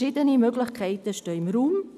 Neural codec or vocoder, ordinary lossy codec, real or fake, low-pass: none; none; real; 14.4 kHz